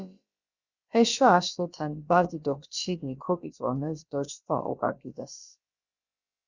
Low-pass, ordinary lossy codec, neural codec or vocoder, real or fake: 7.2 kHz; Opus, 64 kbps; codec, 16 kHz, about 1 kbps, DyCAST, with the encoder's durations; fake